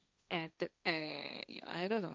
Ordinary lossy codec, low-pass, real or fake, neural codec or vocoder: none; none; fake; codec, 16 kHz, 1.1 kbps, Voila-Tokenizer